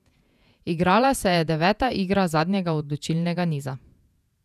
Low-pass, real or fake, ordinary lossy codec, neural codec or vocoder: 14.4 kHz; fake; none; vocoder, 48 kHz, 128 mel bands, Vocos